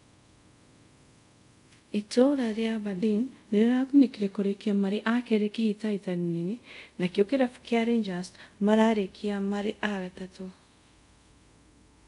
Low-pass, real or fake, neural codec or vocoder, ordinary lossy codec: 10.8 kHz; fake; codec, 24 kHz, 0.5 kbps, DualCodec; none